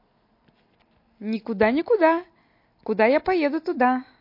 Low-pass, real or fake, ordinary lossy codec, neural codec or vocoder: 5.4 kHz; real; MP3, 32 kbps; none